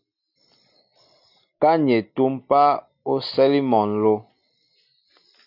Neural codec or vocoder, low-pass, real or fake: none; 5.4 kHz; real